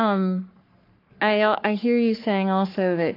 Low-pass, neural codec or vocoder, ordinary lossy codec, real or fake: 5.4 kHz; codec, 44.1 kHz, 3.4 kbps, Pupu-Codec; AAC, 32 kbps; fake